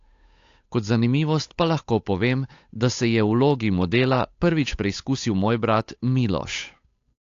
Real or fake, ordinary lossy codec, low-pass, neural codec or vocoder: fake; AAC, 48 kbps; 7.2 kHz; codec, 16 kHz, 8 kbps, FunCodec, trained on Chinese and English, 25 frames a second